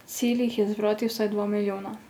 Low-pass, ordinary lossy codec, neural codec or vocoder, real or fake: none; none; none; real